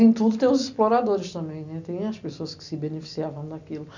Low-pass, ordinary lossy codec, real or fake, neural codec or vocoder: 7.2 kHz; none; real; none